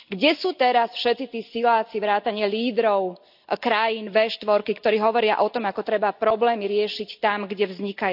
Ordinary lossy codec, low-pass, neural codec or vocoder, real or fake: AAC, 48 kbps; 5.4 kHz; none; real